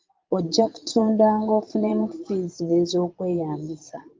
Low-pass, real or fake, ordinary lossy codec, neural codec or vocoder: 7.2 kHz; fake; Opus, 32 kbps; vocoder, 22.05 kHz, 80 mel bands, Vocos